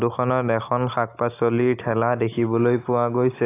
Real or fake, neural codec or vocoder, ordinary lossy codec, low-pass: real; none; AAC, 24 kbps; 3.6 kHz